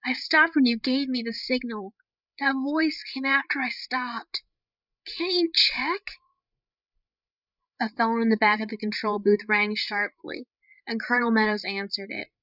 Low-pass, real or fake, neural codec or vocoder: 5.4 kHz; fake; codec, 16 kHz, 8 kbps, FreqCodec, larger model